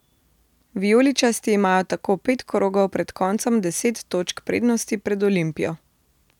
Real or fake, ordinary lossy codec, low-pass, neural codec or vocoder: real; none; 19.8 kHz; none